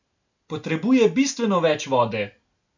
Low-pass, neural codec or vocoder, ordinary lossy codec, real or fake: 7.2 kHz; none; none; real